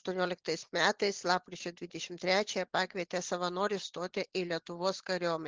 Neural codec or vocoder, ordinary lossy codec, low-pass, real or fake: none; Opus, 16 kbps; 7.2 kHz; real